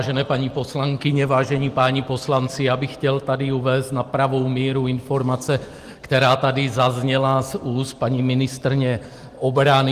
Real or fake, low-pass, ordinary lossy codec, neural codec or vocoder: real; 14.4 kHz; Opus, 24 kbps; none